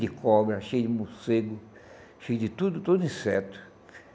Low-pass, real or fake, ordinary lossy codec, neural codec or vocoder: none; real; none; none